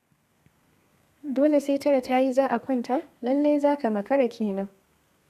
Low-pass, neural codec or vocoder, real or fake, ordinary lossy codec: 14.4 kHz; codec, 32 kHz, 1.9 kbps, SNAC; fake; none